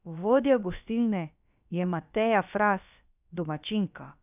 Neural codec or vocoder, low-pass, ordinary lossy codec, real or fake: codec, 16 kHz, about 1 kbps, DyCAST, with the encoder's durations; 3.6 kHz; none; fake